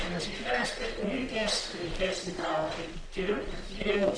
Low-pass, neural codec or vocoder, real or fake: 9.9 kHz; codec, 44.1 kHz, 1.7 kbps, Pupu-Codec; fake